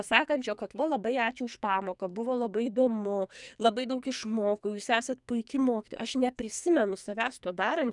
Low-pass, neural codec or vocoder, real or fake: 10.8 kHz; codec, 44.1 kHz, 2.6 kbps, SNAC; fake